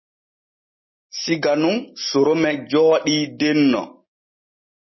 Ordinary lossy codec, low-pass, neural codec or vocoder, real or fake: MP3, 24 kbps; 7.2 kHz; none; real